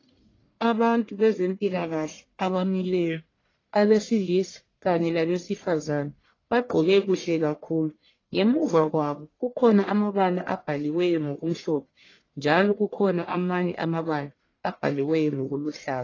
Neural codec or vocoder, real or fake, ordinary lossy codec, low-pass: codec, 44.1 kHz, 1.7 kbps, Pupu-Codec; fake; AAC, 32 kbps; 7.2 kHz